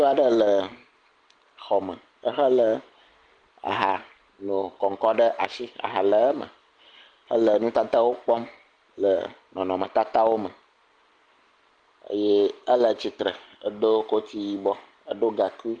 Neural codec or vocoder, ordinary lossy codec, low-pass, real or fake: none; Opus, 24 kbps; 9.9 kHz; real